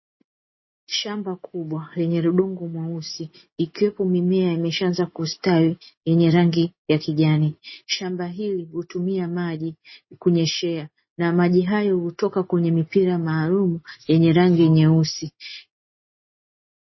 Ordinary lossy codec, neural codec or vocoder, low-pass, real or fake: MP3, 24 kbps; none; 7.2 kHz; real